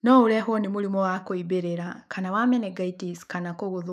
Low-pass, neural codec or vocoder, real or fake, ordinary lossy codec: 14.4 kHz; autoencoder, 48 kHz, 128 numbers a frame, DAC-VAE, trained on Japanese speech; fake; none